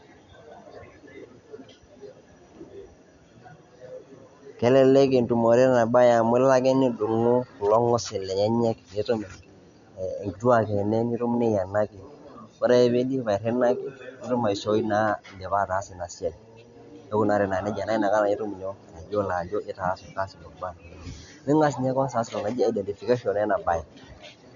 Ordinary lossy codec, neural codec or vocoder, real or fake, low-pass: none; none; real; 7.2 kHz